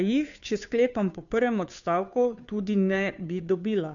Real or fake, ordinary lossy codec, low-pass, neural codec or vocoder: fake; none; 7.2 kHz; codec, 16 kHz, 2 kbps, FunCodec, trained on Chinese and English, 25 frames a second